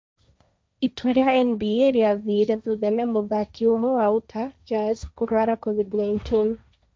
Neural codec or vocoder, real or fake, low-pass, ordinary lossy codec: codec, 16 kHz, 1.1 kbps, Voila-Tokenizer; fake; none; none